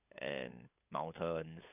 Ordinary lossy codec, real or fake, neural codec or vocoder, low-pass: none; fake; codec, 16 kHz, 8 kbps, FunCodec, trained on LibriTTS, 25 frames a second; 3.6 kHz